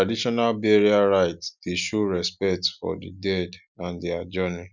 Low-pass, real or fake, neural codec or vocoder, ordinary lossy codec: 7.2 kHz; real; none; none